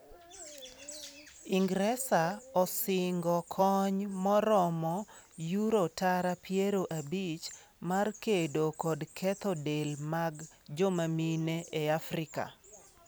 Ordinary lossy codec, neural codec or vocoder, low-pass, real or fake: none; vocoder, 44.1 kHz, 128 mel bands every 256 samples, BigVGAN v2; none; fake